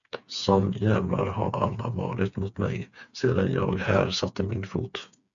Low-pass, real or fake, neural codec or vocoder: 7.2 kHz; fake; codec, 16 kHz, 4 kbps, FreqCodec, smaller model